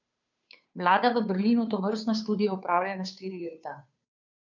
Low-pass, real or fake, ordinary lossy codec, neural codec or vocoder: 7.2 kHz; fake; none; codec, 16 kHz, 2 kbps, FunCodec, trained on Chinese and English, 25 frames a second